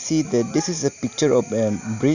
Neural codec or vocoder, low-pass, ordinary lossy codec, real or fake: none; 7.2 kHz; none; real